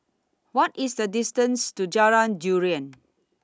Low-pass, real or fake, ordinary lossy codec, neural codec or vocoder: none; real; none; none